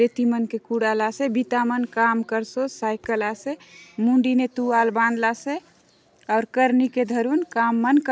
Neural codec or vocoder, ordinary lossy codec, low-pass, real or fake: none; none; none; real